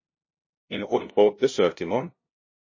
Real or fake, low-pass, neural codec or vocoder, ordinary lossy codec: fake; 7.2 kHz; codec, 16 kHz, 0.5 kbps, FunCodec, trained on LibriTTS, 25 frames a second; MP3, 32 kbps